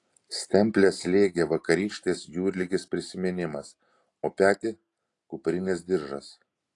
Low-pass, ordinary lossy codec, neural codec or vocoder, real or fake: 10.8 kHz; AAC, 48 kbps; none; real